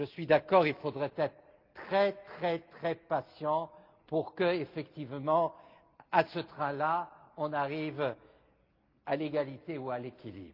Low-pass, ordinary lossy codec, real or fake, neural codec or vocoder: 5.4 kHz; Opus, 32 kbps; real; none